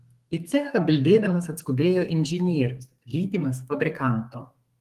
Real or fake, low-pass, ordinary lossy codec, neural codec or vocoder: fake; 14.4 kHz; Opus, 24 kbps; codec, 32 kHz, 1.9 kbps, SNAC